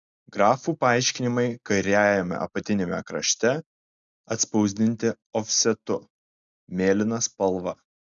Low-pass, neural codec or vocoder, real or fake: 7.2 kHz; none; real